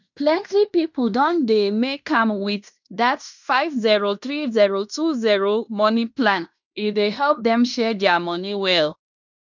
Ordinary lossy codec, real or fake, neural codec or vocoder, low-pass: none; fake; codec, 16 kHz in and 24 kHz out, 0.9 kbps, LongCat-Audio-Codec, fine tuned four codebook decoder; 7.2 kHz